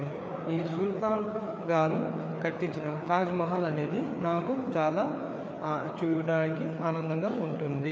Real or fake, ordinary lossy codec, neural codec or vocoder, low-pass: fake; none; codec, 16 kHz, 4 kbps, FreqCodec, larger model; none